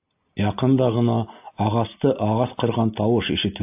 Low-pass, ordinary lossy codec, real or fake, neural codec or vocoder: 3.6 kHz; AAC, 32 kbps; real; none